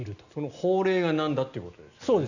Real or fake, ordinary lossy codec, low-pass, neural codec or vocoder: real; none; 7.2 kHz; none